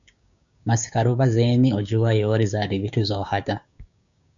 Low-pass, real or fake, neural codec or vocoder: 7.2 kHz; fake; codec, 16 kHz, 6 kbps, DAC